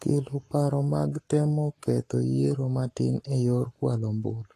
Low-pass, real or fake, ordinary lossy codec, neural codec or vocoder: 14.4 kHz; fake; AAC, 48 kbps; codec, 44.1 kHz, 7.8 kbps, Pupu-Codec